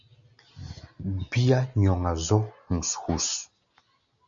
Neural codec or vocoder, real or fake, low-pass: none; real; 7.2 kHz